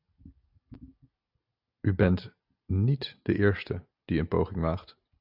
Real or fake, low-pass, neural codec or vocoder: real; 5.4 kHz; none